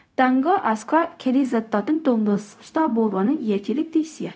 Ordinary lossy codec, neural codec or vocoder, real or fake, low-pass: none; codec, 16 kHz, 0.4 kbps, LongCat-Audio-Codec; fake; none